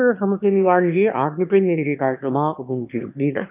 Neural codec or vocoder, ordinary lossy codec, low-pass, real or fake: autoencoder, 22.05 kHz, a latent of 192 numbers a frame, VITS, trained on one speaker; none; 3.6 kHz; fake